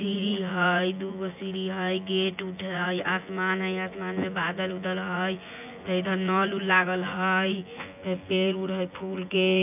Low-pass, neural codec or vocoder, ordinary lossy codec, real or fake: 3.6 kHz; vocoder, 24 kHz, 100 mel bands, Vocos; AAC, 32 kbps; fake